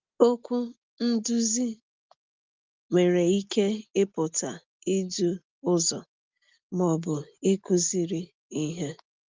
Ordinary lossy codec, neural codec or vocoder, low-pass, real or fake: Opus, 32 kbps; none; 7.2 kHz; real